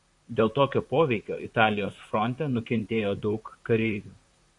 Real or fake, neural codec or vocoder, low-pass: fake; vocoder, 44.1 kHz, 128 mel bands, Pupu-Vocoder; 10.8 kHz